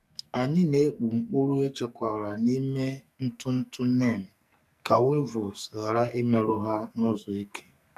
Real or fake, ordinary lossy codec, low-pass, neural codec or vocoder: fake; none; 14.4 kHz; codec, 44.1 kHz, 2.6 kbps, SNAC